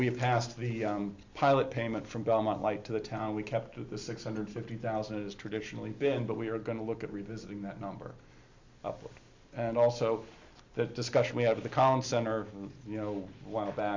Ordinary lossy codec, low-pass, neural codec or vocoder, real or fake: MP3, 64 kbps; 7.2 kHz; none; real